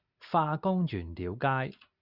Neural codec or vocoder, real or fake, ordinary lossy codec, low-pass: none; real; Opus, 64 kbps; 5.4 kHz